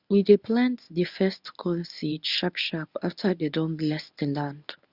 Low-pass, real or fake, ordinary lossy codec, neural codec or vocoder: 5.4 kHz; fake; Opus, 64 kbps; codec, 24 kHz, 0.9 kbps, WavTokenizer, medium speech release version 1